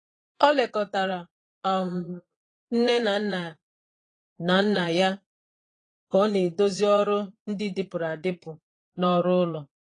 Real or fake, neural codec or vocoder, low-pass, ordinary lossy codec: fake; vocoder, 22.05 kHz, 80 mel bands, Vocos; 9.9 kHz; AAC, 32 kbps